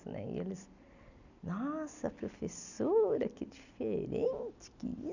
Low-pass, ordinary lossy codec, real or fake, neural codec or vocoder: 7.2 kHz; none; real; none